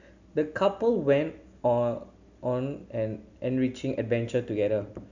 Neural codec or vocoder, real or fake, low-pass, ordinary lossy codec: none; real; 7.2 kHz; none